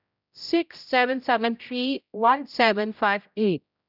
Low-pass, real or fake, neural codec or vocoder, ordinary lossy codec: 5.4 kHz; fake; codec, 16 kHz, 0.5 kbps, X-Codec, HuBERT features, trained on general audio; none